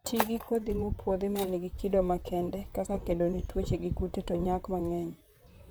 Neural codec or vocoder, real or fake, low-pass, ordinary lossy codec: vocoder, 44.1 kHz, 128 mel bands, Pupu-Vocoder; fake; none; none